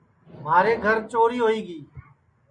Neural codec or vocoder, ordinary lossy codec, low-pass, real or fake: none; MP3, 48 kbps; 9.9 kHz; real